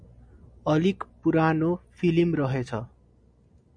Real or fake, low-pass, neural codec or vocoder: real; 9.9 kHz; none